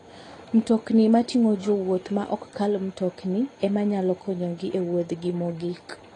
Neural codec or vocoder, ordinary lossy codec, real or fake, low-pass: none; AAC, 32 kbps; real; 10.8 kHz